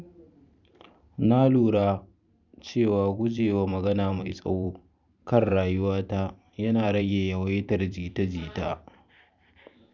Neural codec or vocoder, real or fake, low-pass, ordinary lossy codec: vocoder, 44.1 kHz, 128 mel bands every 512 samples, BigVGAN v2; fake; 7.2 kHz; none